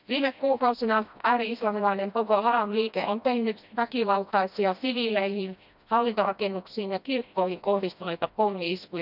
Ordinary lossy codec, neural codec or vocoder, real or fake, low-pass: none; codec, 16 kHz, 1 kbps, FreqCodec, smaller model; fake; 5.4 kHz